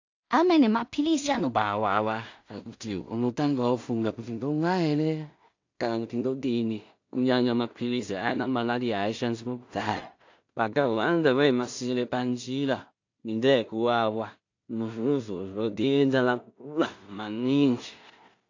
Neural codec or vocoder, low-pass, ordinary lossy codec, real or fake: codec, 16 kHz in and 24 kHz out, 0.4 kbps, LongCat-Audio-Codec, two codebook decoder; 7.2 kHz; AAC, 48 kbps; fake